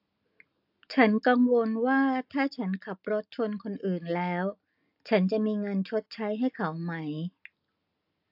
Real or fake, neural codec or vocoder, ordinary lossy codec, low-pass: real; none; AAC, 48 kbps; 5.4 kHz